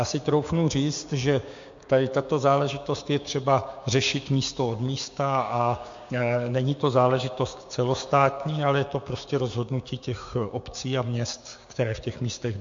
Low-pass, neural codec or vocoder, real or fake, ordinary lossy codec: 7.2 kHz; codec, 16 kHz, 6 kbps, DAC; fake; MP3, 48 kbps